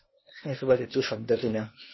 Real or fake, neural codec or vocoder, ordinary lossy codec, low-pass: fake; codec, 16 kHz, 0.8 kbps, ZipCodec; MP3, 24 kbps; 7.2 kHz